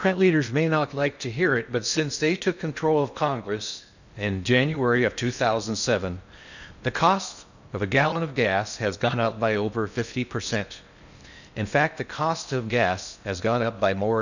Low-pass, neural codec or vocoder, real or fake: 7.2 kHz; codec, 16 kHz in and 24 kHz out, 0.6 kbps, FocalCodec, streaming, 2048 codes; fake